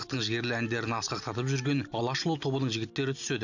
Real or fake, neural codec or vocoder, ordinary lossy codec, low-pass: real; none; none; 7.2 kHz